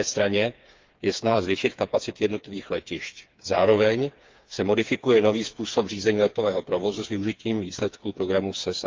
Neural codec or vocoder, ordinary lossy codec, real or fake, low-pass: codec, 16 kHz, 4 kbps, FreqCodec, smaller model; Opus, 24 kbps; fake; 7.2 kHz